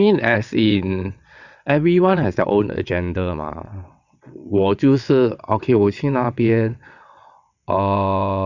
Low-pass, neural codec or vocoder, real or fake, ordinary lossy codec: 7.2 kHz; vocoder, 22.05 kHz, 80 mel bands, WaveNeXt; fake; none